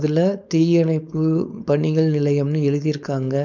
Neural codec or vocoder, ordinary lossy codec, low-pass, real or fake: codec, 16 kHz, 4.8 kbps, FACodec; none; 7.2 kHz; fake